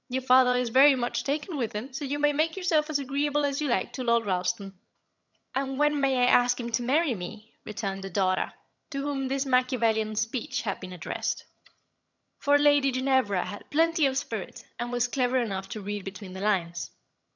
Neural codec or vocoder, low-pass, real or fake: vocoder, 22.05 kHz, 80 mel bands, HiFi-GAN; 7.2 kHz; fake